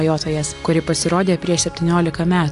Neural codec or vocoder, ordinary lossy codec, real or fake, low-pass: none; AAC, 64 kbps; real; 10.8 kHz